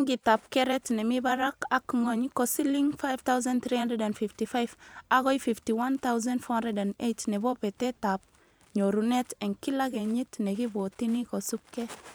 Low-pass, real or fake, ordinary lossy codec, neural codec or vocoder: none; fake; none; vocoder, 44.1 kHz, 128 mel bands every 512 samples, BigVGAN v2